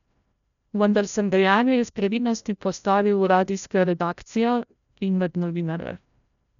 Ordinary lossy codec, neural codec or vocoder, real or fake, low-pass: none; codec, 16 kHz, 0.5 kbps, FreqCodec, larger model; fake; 7.2 kHz